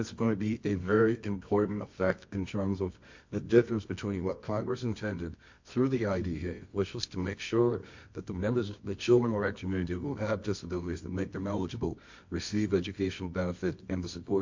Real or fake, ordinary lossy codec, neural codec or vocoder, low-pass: fake; MP3, 48 kbps; codec, 24 kHz, 0.9 kbps, WavTokenizer, medium music audio release; 7.2 kHz